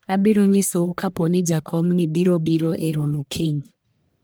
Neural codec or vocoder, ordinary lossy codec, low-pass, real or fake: codec, 44.1 kHz, 1.7 kbps, Pupu-Codec; none; none; fake